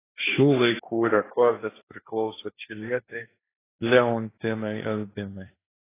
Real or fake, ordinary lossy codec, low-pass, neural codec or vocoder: fake; AAC, 16 kbps; 3.6 kHz; codec, 16 kHz, 1.1 kbps, Voila-Tokenizer